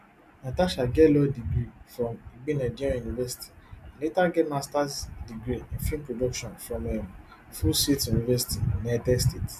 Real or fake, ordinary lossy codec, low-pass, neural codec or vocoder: real; none; 14.4 kHz; none